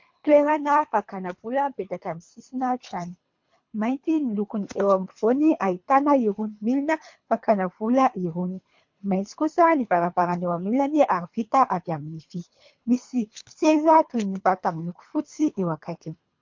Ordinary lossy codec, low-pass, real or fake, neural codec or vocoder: MP3, 48 kbps; 7.2 kHz; fake; codec, 24 kHz, 3 kbps, HILCodec